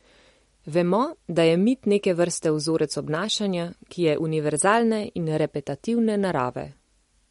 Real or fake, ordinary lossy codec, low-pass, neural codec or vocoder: real; MP3, 48 kbps; 14.4 kHz; none